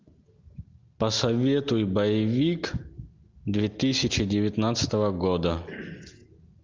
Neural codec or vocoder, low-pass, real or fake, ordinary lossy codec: none; 7.2 kHz; real; Opus, 32 kbps